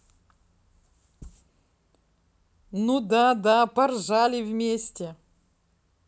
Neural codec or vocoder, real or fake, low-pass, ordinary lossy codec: none; real; none; none